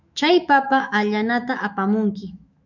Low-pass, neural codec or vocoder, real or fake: 7.2 kHz; autoencoder, 48 kHz, 128 numbers a frame, DAC-VAE, trained on Japanese speech; fake